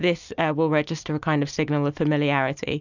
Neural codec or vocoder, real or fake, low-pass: none; real; 7.2 kHz